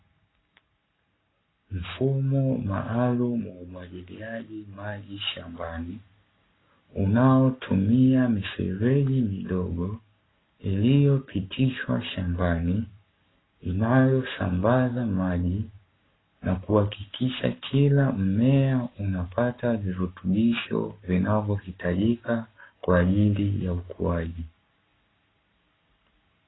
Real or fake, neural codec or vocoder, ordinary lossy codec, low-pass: fake; codec, 44.1 kHz, 7.8 kbps, Pupu-Codec; AAC, 16 kbps; 7.2 kHz